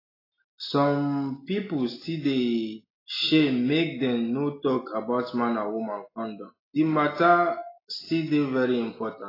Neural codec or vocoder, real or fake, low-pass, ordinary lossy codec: none; real; 5.4 kHz; AAC, 24 kbps